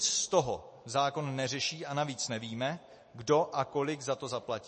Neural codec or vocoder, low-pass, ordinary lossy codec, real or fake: none; 10.8 kHz; MP3, 32 kbps; real